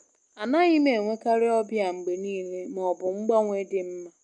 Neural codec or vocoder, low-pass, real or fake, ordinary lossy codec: none; 10.8 kHz; real; none